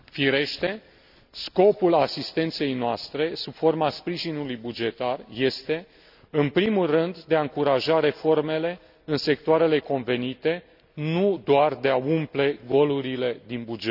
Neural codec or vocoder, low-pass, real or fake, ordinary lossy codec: none; 5.4 kHz; real; none